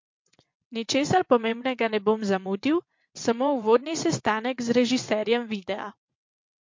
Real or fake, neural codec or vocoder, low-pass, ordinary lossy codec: fake; vocoder, 22.05 kHz, 80 mel bands, WaveNeXt; 7.2 kHz; MP3, 48 kbps